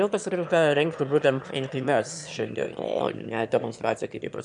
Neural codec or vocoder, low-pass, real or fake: autoencoder, 22.05 kHz, a latent of 192 numbers a frame, VITS, trained on one speaker; 9.9 kHz; fake